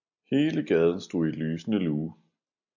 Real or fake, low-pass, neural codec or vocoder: real; 7.2 kHz; none